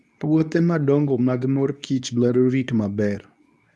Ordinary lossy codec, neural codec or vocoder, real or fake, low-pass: none; codec, 24 kHz, 0.9 kbps, WavTokenizer, medium speech release version 2; fake; none